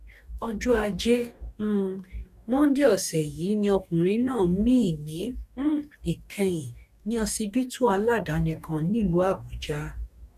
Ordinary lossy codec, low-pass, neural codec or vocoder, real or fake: none; 14.4 kHz; codec, 44.1 kHz, 2.6 kbps, DAC; fake